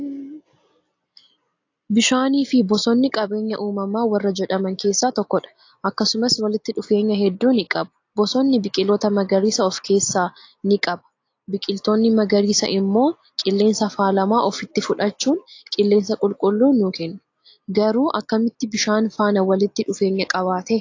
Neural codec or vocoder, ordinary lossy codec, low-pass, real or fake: none; AAC, 48 kbps; 7.2 kHz; real